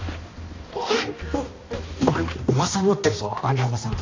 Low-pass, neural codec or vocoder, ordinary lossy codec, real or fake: 7.2 kHz; codec, 16 kHz, 1 kbps, X-Codec, HuBERT features, trained on balanced general audio; AAC, 32 kbps; fake